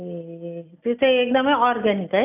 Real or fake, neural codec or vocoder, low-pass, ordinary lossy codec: real; none; 3.6 kHz; none